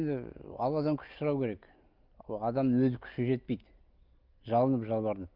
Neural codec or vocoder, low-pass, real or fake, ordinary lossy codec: none; 5.4 kHz; real; Opus, 24 kbps